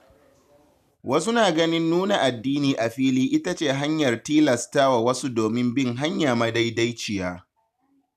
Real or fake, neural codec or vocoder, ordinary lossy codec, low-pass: real; none; none; 14.4 kHz